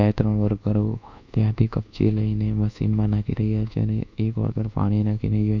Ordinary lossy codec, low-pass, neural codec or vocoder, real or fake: none; 7.2 kHz; codec, 24 kHz, 1.2 kbps, DualCodec; fake